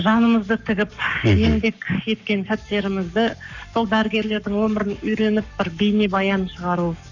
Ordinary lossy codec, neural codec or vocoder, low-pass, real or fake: none; codec, 44.1 kHz, 7.8 kbps, Pupu-Codec; 7.2 kHz; fake